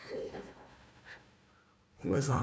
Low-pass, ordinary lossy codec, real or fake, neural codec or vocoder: none; none; fake; codec, 16 kHz, 1 kbps, FunCodec, trained on Chinese and English, 50 frames a second